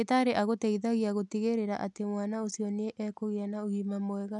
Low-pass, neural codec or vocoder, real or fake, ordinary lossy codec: 10.8 kHz; none; real; none